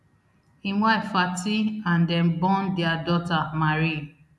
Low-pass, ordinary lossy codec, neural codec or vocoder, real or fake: none; none; none; real